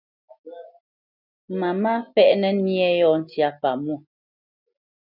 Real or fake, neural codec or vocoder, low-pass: real; none; 5.4 kHz